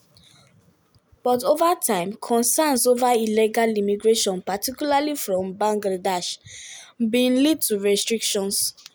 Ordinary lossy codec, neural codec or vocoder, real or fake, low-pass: none; none; real; none